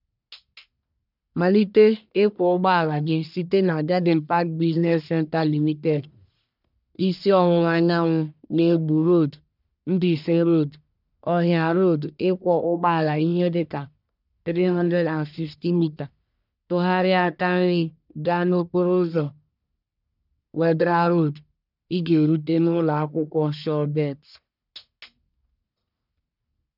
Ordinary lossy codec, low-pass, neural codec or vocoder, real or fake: none; 5.4 kHz; codec, 44.1 kHz, 1.7 kbps, Pupu-Codec; fake